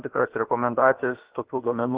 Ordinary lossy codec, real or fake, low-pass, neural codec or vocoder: Opus, 16 kbps; fake; 3.6 kHz; codec, 16 kHz, about 1 kbps, DyCAST, with the encoder's durations